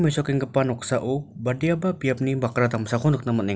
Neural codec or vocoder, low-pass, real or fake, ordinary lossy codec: none; none; real; none